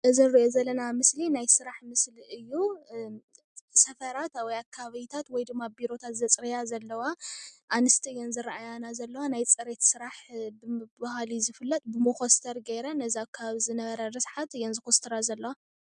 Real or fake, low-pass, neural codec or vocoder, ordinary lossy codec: real; 9.9 kHz; none; MP3, 96 kbps